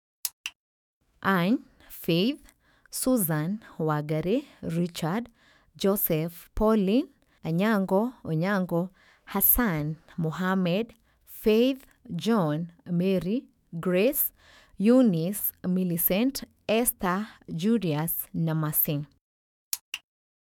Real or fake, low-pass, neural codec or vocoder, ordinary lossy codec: fake; none; autoencoder, 48 kHz, 128 numbers a frame, DAC-VAE, trained on Japanese speech; none